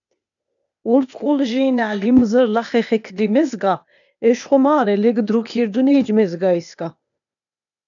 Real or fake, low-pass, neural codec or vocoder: fake; 7.2 kHz; codec, 16 kHz, 0.8 kbps, ZipCodec